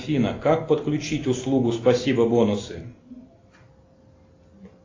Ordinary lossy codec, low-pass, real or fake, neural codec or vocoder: AAC, 32 kbps; 7.2 kHz; real; none